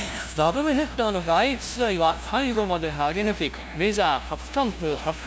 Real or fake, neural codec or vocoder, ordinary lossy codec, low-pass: fake; codec, 16 kHz, 0.5 kbps, FunCodec, trained on LibriTTS, 25 frames a second; none; none